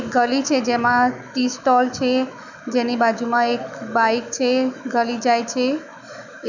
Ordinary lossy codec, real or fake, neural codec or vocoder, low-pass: none; fake; autoencoder, 48 kHz, 128 numbers a frame, DAC-VAE, trained on Japanese speech; 7.2 kHz